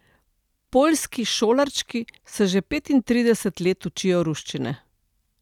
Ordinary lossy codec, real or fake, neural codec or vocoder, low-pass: none; real; none; 19.8 kHz